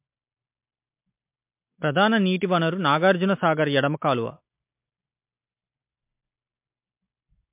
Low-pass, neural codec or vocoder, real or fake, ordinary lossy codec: 3.6 kHz; none; real; MP3, 32 kbps